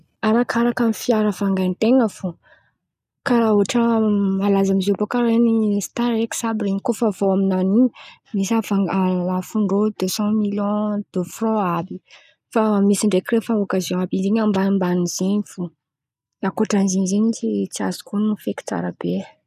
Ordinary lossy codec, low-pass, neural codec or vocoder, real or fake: none; 14.4 kHz; none; real